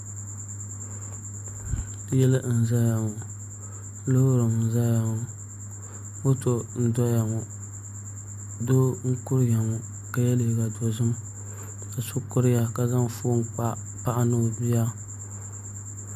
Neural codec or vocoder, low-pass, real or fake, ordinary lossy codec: none; 14.4 kHz; real; MP3, 96 kbps